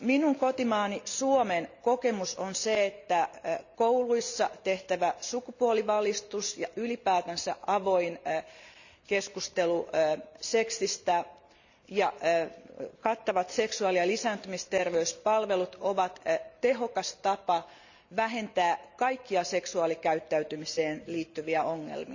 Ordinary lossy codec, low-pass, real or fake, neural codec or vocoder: none; 7.2 kHz; real; none